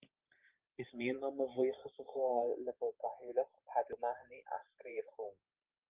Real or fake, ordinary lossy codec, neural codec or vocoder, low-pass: fake; Opus, 32 kbps; vocoder, 44.1 kHz, 128 mel bands every 512 samples, BigVGAN v2; 3.6 kHz